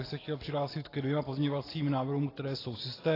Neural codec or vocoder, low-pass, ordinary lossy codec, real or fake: none; 5.4 kHz; AAC, 24 kbps; real